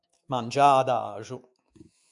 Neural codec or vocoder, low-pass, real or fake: autoencoder, 48 kHz, 128 numbers a frame, DAC-VAE, trained on Japanese speech; 10.8 kHz; fake